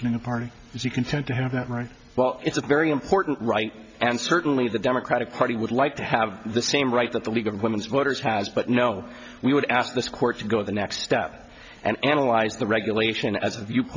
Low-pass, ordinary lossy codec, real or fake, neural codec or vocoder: 7.2 kHz; MP3, 64 kbps; real; none